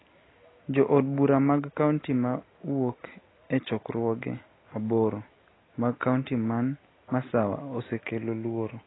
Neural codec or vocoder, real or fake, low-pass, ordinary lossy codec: none; real; 7.2 kHz; AAC, 16 kbps